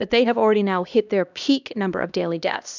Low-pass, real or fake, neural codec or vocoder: 7.2 kHz; fake; codec, 16 kHz, 1 kbps, X-Codec, HuBERT features, trained on LibriSpeech